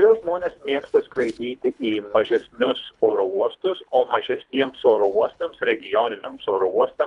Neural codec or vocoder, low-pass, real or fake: codec, 24 kHz, 3 kbps, HILCodec; 10.8 kHz; fake